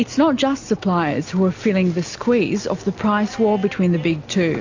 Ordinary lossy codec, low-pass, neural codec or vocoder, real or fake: AAC, 48 kbps; 7.2 kHz; none; real